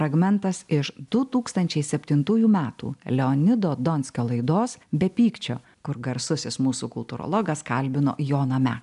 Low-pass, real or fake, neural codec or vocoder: 10.8 kHz; real; none